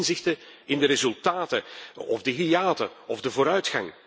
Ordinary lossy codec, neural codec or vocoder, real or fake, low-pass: none; none; real; none